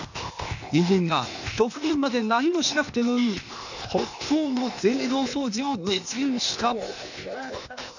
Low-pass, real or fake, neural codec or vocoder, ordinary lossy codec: 7.2 kHz; fake; codec, 16 kHz, 0.8 kbps, ZipCodec; none